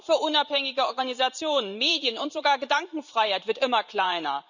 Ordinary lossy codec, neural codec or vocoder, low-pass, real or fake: none; vocoder, 44.1 kHz, 128 mel bands every 512 samples, BigVGAN v2; 7.2 kHz; fake